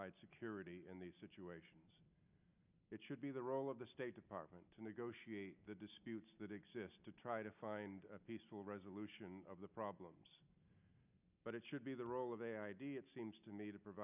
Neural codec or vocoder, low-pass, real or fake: none; 3.6 kHz; real